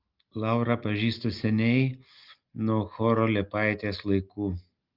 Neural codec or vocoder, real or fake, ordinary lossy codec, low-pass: none; real; Opus, 32 kbps; 5.4 kHz